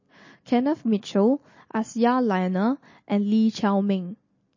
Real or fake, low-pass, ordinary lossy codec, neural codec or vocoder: real; 7.2 kHz; MP3, 32 kbps; none